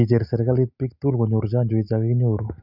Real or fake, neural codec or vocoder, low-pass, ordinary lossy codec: real; none; 5.4 kHz; none